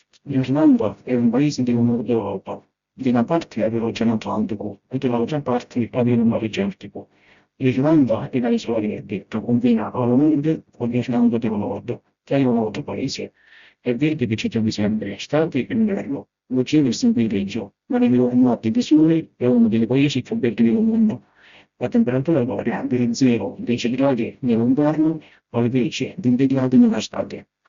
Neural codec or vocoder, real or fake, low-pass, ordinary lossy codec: codec, 16 kHz, 0.5 kbps, FreqCodec, smaller model; fake; 7.2 kHz; Opus, 64 kbps